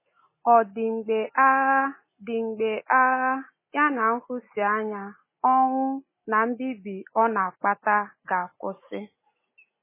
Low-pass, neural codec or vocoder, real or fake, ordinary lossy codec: 3.6 kHz; none; real; MP3, 16 kbps